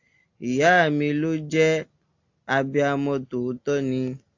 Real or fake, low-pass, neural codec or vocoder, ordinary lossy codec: real; 7.2 kHz; none; AAC, 48 kbps